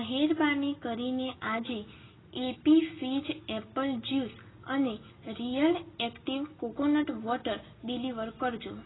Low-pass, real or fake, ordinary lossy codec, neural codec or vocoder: 7.2 kHz; real; AAC, 16 kbps; none